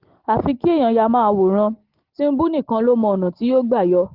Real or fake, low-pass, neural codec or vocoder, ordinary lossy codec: real; 5.4 kHz; none; Opus, 16 kbps